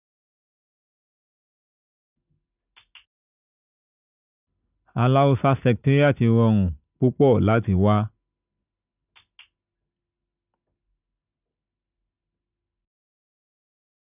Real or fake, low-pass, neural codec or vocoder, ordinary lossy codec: real; 3.6 kHz; none; none